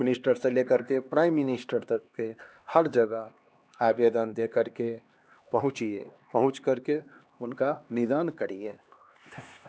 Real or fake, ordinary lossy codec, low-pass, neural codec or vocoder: fake; none; none; codec, 16 kHz, 2 kbps, X-Codec, HuBERT features, trained on LibriSpeech